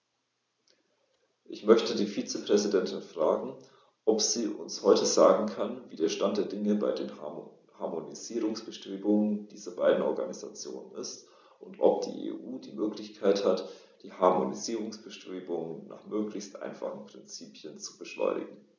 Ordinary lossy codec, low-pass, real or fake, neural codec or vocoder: none; none; real; none